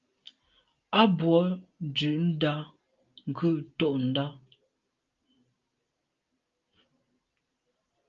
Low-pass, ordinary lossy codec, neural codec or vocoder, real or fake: 7.2 kHz; Opus, 32 kbps; none; real